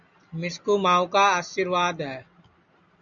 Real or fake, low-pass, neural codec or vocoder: real; 7.2 kHz; none